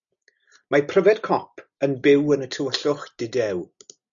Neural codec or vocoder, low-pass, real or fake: none; 7.2 kHz; real